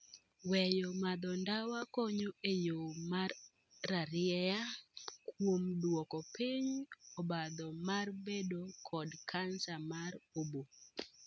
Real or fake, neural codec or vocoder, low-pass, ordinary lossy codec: real; none; none; none